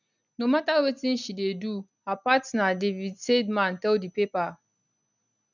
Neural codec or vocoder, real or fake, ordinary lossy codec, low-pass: none; real; none; 7.2 kHz